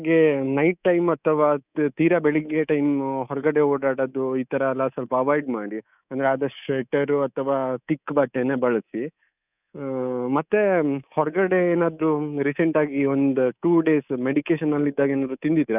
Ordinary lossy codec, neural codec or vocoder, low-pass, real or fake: none; codec, 24 kHz, 3.1 kbps, DualCodec; 3.6 kHz; fake